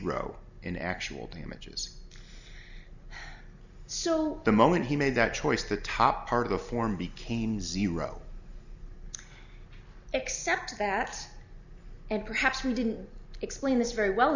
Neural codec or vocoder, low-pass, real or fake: none; 7.2 kHz; real